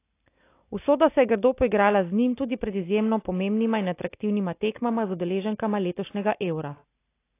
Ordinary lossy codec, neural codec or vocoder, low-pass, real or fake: AAC, 24 kbps; none; 3.6 kHz; real